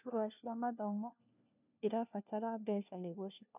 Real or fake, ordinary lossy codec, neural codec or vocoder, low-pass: fake; none; codec, 16 kHz, 1 kbps, FunCodec, trained on LibriTTS, 50 frames a second; 3.6 kHz